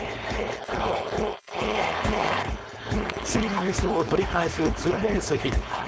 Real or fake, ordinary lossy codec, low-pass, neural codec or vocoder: fake; none; none; codec, 16 kHz, 4.8 kbps, FACodec